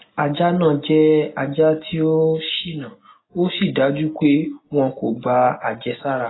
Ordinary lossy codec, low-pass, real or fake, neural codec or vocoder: AAC, 16 kbps; 7.2 kHz; real; none